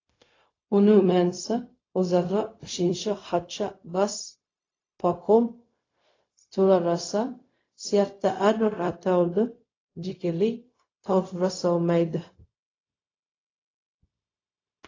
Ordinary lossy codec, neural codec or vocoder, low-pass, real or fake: AAC, 32 kbps; codec, 16 kHz, 0.4 kbps, LongCat-Audio-Codec; 7.2 kHz; fake